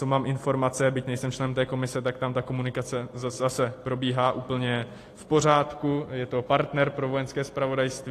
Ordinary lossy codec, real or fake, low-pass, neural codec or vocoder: AAC, 48 kbps; real; 14.4 kHz; none